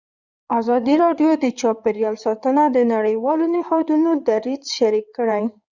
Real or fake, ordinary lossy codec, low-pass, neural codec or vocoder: fake; Opus, 64 kbps; 7.2 kHz; codec, 16 kHz in and 24 kHz out, 2.2 kbps, FireRedTTS-2 codec